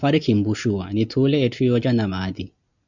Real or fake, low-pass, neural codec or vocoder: real; 7.2 kHz; none